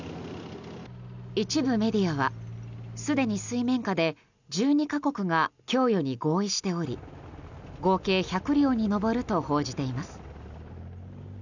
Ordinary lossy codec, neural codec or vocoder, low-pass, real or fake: none; none; 7.2 kHz; real